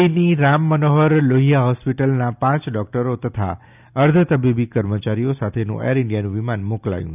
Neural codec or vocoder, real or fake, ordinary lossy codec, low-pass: none; real; none; 3.6 kHz